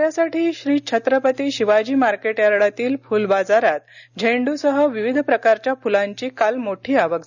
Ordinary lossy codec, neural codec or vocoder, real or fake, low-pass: none; none; real; 7.2 kHz